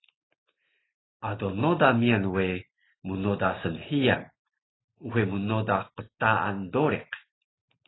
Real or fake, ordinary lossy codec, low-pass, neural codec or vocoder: real; AAC, 16 kbps; 7.2 kHz; none